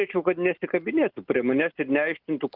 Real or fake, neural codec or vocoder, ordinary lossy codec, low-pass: real; none; Opus, 32 kbps; 5.4 kHz